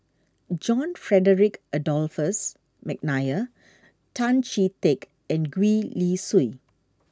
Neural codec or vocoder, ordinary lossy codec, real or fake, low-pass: none; none; real; none